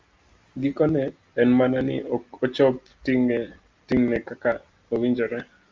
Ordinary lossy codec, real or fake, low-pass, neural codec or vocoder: Opus, 32 kbps; real; 7.2 kHz; none